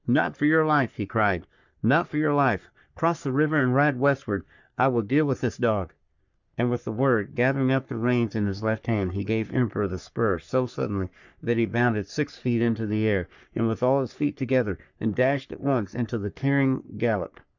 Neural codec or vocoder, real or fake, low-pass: codec, 44.1 kHz, 3.4 kbps, Pupu-Codec; fake; 7.2 kHz